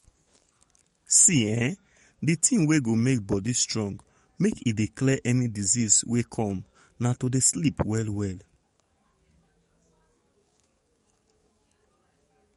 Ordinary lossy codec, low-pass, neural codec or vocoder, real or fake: MP3, 48 kbps; 19.8 kHz; codec, 44.1 kHz, 7.8 kbps, DAC; fake